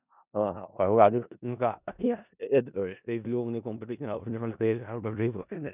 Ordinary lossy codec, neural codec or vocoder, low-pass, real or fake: none; codec, 16 kHz in and 24 kHz out, 0.4 kbps, LongCat-Audio-Codec, four codebook decoder; 3.6 kHz; fake